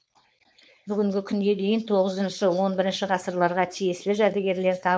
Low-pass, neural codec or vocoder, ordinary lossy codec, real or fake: none; codec, 16 kHz, 4.8 kbps, FACodec; none; fake